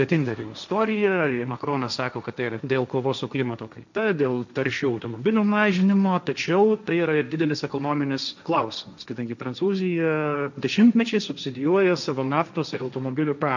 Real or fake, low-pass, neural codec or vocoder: fake; 7.2 kHz; codec, 16 kHz, 1.1 kbps, Voila-Tokenizer